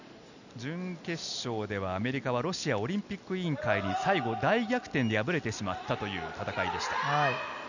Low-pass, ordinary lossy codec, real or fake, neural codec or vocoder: 7.2 kHz; none; real; none